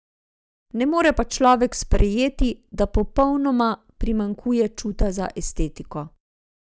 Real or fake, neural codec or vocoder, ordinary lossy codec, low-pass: real; none; none; none